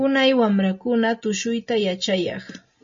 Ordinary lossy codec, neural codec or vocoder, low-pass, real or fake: MP3, 32 kbps; none; 7.2 kHz; real